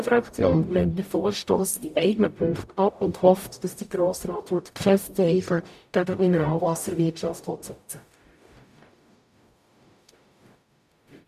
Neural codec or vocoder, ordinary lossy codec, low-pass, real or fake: codec, 44.1 kHz, 0.9 kbps, DAC; none; 14.4 kHz; fake